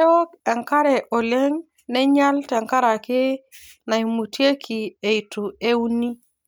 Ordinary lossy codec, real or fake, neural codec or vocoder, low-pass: none; real; none; none